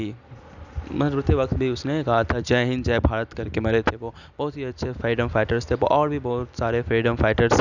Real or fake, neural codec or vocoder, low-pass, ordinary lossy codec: real; none; 7.2 kHz; none